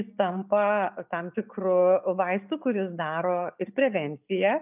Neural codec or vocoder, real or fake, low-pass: none; real; 3.6 kHz